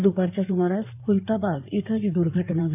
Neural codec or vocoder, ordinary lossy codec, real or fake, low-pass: codec, 16 kHz in and 24 kHz out, 2.2 kbps, FireRedTTS-2 codec; none; fake; 3.6 kHz